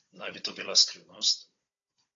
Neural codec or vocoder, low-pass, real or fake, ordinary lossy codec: codec, 16 kHz, 16 kbps, FunCodec, trained on Chinese and English, 50 frames a second; 7.2 kHz; fake; AAC, 32 kbps